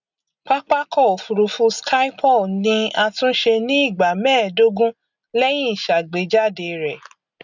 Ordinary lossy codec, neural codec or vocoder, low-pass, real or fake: none; none; 7.2 kHz; real